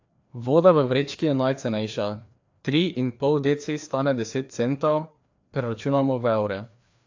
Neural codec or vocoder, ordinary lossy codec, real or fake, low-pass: codec, 16 kHz, 2 kbps, FreqCodec, larger model; AAC, 48 kbps; fake; 7.2 kHz